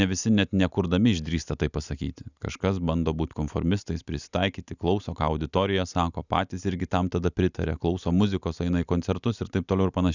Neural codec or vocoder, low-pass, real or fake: none; 7.2 kHz; real